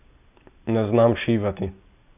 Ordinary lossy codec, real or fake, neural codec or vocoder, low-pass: none; real; none; 3.6 kHz